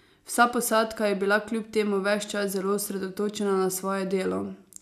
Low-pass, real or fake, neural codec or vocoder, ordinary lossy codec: 14.4 kHz; real; none; none